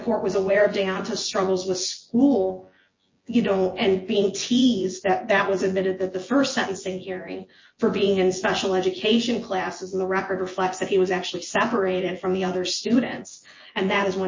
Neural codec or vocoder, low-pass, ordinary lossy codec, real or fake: vocoder, 24 kHz, 100 mel bands, Vocos; 7.2 kHz; MP3, 32 kbps; fake